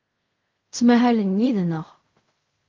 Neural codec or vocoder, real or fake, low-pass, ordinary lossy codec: codec, 16 kHz in and 24 kHz out, 0.4 kbps, LongCat-Audio-Codec, fine tuned four codebook decoder; fake; 7.2 kHz; Opus, 32 kbps